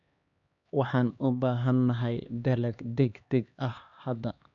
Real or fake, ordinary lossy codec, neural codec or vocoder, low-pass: fake; none; codec, 16 kHz, 2 kbps, X-Codec, HuBERT features, trained on LibriSpeech; 7.2 kHz